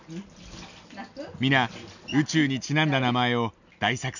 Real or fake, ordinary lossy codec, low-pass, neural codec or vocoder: real; none; 7.2 kHz; none